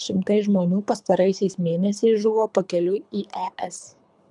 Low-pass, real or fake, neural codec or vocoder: 10.8 kHz; fake; codec, 24 kHz, 3 kbps, HILCodec